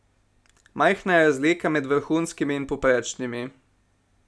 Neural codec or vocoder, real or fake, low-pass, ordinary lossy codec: none; real; none; none